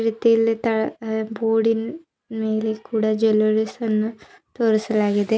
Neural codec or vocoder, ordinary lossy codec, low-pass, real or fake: none; none; none; real